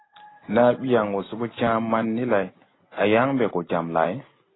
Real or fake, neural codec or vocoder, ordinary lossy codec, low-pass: real; none; AAC, 16 kbps; 7.2 kHz